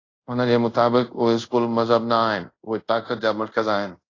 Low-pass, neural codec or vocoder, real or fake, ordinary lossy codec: 7.2 kHz; codec, 24 kHz, 0.5 kbps, DualCodec; fake; AAC, 32 kbps